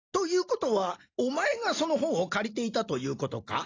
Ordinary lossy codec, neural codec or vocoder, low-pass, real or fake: AAC, 32 kbps; none; 7.2 kHz; real